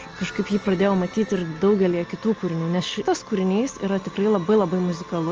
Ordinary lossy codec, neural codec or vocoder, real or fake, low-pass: Opus, 24 kbps; none; real; 7.2 kHz